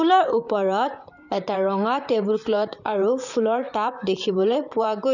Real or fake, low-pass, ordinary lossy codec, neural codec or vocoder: fake; 7.2 kHz; none; vocoder, 44.1 kHz, 128 mel bands, Pupu-Vocoder